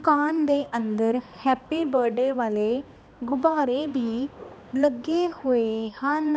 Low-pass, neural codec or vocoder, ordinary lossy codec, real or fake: none; codec, 16 kHz, 2 kbps, X-Codec, HuBERT features, trained on balanced general audio; none; fake